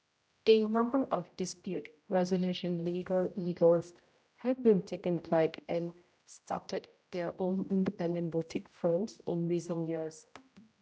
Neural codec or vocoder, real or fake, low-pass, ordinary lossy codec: codec, 16 kHz, 0.5 kbps, X-Codec, HuBERT features, trained on general audio; fake; none; none